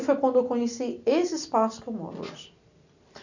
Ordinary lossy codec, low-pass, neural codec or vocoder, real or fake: none; 7.2 kHz; none; real